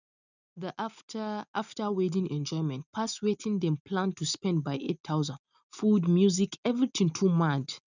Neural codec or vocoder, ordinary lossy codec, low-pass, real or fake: none; none; 7.2 kHz; real